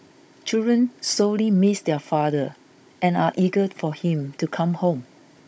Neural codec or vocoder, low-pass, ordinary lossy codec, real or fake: codec, 16 kHz, 16 kbps, FunCodec, trained on Chinese and English, 50 frames a second; none; none; fake